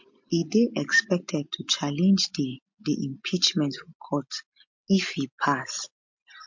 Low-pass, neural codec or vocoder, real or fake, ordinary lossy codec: 7.2 kHz; none; real; MP3, 48 kbps